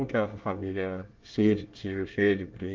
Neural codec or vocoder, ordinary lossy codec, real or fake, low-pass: codec, 24 kHz, 1 kbps, SNAC; Opus, 24 kbps; fake; 7.2 kHz